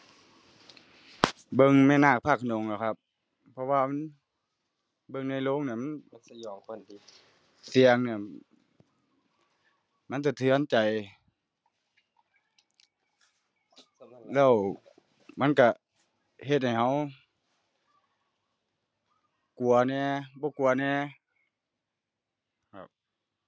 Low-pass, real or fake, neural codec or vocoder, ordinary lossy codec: none; real; none; none